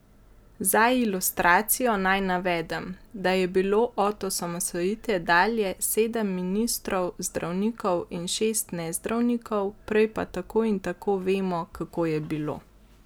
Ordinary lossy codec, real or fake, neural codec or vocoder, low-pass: none; real; none; none